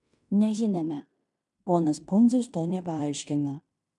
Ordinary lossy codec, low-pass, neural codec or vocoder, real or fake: MP3, 96 kbps; 10.8 kHz; codec, 16 kHz in and 24 kHz out, 0.9 kbps, LongCat-Audio-Codec, fine tuned four codebook decoder; fake